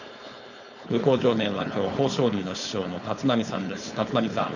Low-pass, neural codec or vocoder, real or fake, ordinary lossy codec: 7.2 kHz; codec, 16 kHz, 4.8 kbps, FACodec; fake; none